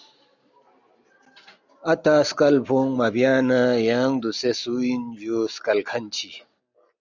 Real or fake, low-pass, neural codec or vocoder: real; 7.2 kHz; none